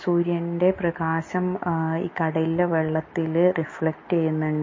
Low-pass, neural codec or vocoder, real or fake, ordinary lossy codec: 7.2 kHz; none; real; MP3, 32 kbps